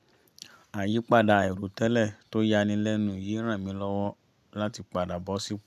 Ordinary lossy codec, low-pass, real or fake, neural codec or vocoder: none; 14.4 kHz; real; none